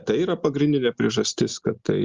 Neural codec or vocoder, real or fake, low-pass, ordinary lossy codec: none; real; 7.2 kHz; Opus, 32 kbps